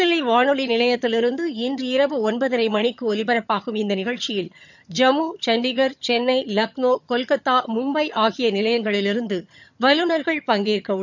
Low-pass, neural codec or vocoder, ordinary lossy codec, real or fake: 7.2 kHz; vocoder, 22.05 kHz, 80 mel bands, HiFi-GAN; none; fake